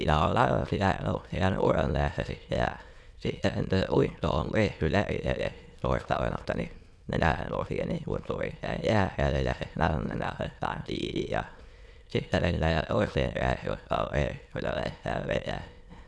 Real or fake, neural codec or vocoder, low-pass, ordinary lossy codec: fake; autoencoder, 22.05 kHz, a latent of 192 numbers a frame, VITS, trained on many speakers; none; none